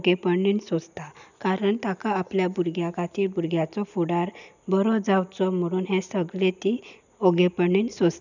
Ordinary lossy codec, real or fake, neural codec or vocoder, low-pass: none; real; none; 7.2 kHz